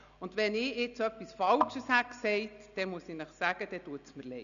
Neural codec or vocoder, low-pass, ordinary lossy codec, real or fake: none; 7.2 kHz; none; real